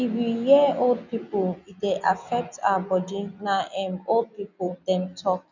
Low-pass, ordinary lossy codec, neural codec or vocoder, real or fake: 7.2 kHz; none; none; real